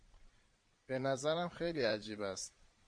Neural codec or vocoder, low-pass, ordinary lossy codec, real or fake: vocoder, 22.05 kHz, 80 mel bands, Vocos; 9.9 kHz; MP3, 48 kbps; fake